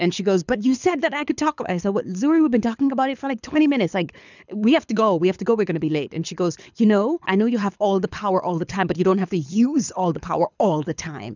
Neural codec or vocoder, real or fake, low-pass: codec, 24 kHz, 6 kbps, HILCodec; fake; 7.2 kHz